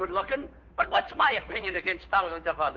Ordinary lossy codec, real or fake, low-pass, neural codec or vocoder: Opus, 32 kbps; fake; 7.2 kHz; codec, 16 kHz, 2 kbps, FunCodec, trained on Chinese and English, 25 frames a second